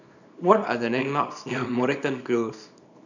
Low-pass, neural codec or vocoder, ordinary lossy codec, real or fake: 7.2 kHz; codec, 24 kHz, 0.9 kbps, WavTokenizer, small release; none; fake